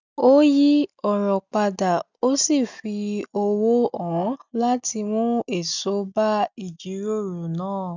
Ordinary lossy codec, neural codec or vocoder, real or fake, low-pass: none; none; real; 7.2 kHz